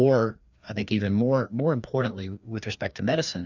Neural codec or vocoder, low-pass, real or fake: codec, 16 kHz, 2 kbps, FreqCodec, larger model; 7.2 kHz; fake